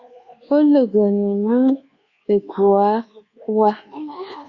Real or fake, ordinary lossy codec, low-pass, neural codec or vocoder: fake; Opus, 64 kbps; 7.2 kHz; codec, 24 kHz, 1.2 kbps, DualCodec